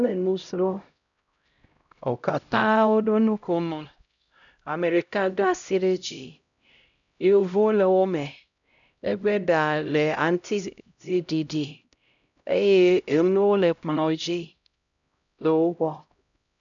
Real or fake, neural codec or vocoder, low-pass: fake; codec, 16 kHz, 0.5 kbps, X-Codec, HuBERT features, trained on LibriSpeech; 7.2 kHz